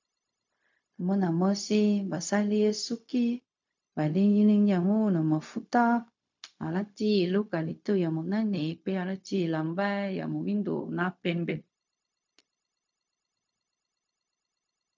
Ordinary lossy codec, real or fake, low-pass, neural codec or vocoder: MP3, 64 kbps; fake; 7.2 kHz; codec, 16 kHz, 0.4 kbps, LongCat-Audio-Codec